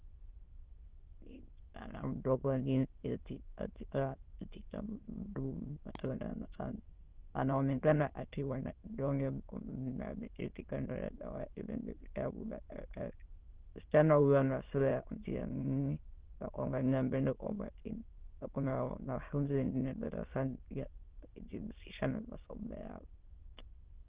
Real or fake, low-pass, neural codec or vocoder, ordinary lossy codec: fake; 3.6 kHz; autoencoder, 22.05 kHz, a latent of 192 numbers a frame, VITS, trained on many speakers; Opus, 16 kbps